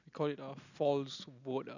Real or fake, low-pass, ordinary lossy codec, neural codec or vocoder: real; 7.2 kHz; none; none